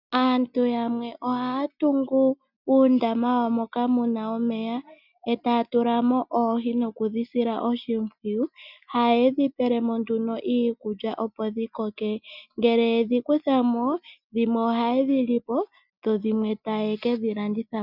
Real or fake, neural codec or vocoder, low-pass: real; none; 5.4 kHz